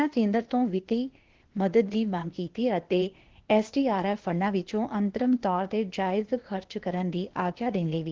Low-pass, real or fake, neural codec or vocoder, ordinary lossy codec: 7.2 kHz; fake; codec, 16 kHz, 0.8 kbps, ZipCodec; Opus, 16 kbps